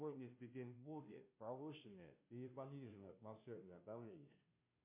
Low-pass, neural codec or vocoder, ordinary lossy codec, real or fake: 3.6 kHz; codec, 16 kHz, 0.5 kbps, FunCodec, trained on Chinese and English, 25 frames a second; MP3, 32 kbps; fake